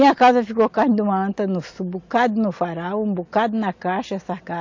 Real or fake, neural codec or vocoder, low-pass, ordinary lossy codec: real; none; 7.2 kHz; MP3, 48 kbps